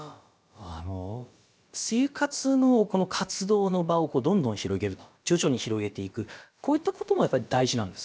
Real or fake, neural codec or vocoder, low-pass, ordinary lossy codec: fake; codec, 16 kHz, about 1 kbps, DyCAST, with the encoder's durations; none; none